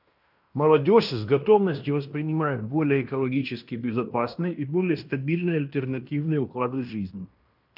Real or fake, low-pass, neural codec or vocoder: fake; 5.4 kHz; codec, 16 kHz in and 24 kHz out, 0.9 kbps, LongCat-Audio-Codec, fine tuned four codebook decoder